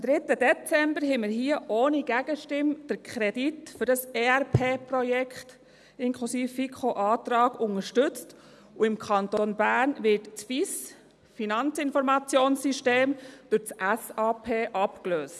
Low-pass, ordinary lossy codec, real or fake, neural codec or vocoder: none; none; real; none